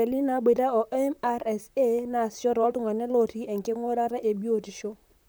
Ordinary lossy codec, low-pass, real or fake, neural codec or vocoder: none; none; fake; vocoder, 44.1 kHz, 128 mel bands, Pupu-Vocoder